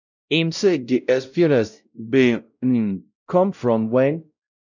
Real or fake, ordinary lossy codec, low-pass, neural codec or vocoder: fake; none; 7.2 kHz; codec, 16 kHz, 0.5 kbps, X-Codec, WavLM features, trained on Multilingual LibriSpeech